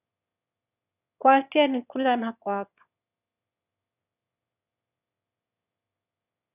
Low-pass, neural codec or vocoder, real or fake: 3.6 kHz; autoencoder, 22.05 kHz, a latent of 192 numbers a frame, VITS, trained on one speaker; fake